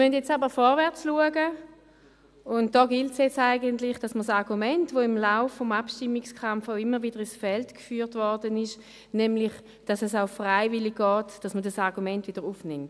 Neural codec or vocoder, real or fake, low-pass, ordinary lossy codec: none; real; none; none